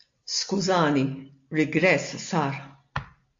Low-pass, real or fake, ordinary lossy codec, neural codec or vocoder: 7.2 kHz; real; AAC, 48 kbps; none